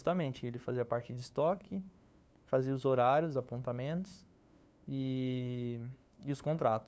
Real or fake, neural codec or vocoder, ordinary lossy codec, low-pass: fake; codec, 16 kHz, 2 kbps, FunCodec, trained on LibriTTS, 25 frames a second; none; none